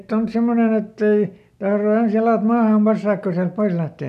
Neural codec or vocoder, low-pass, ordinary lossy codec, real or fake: none; 14.4 kHz; none; real